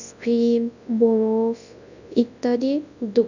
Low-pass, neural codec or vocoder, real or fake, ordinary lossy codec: 7.2 kHz; codec, 24 kHz, 0.9 kbps, WavTokenizer, large speech release; fake; none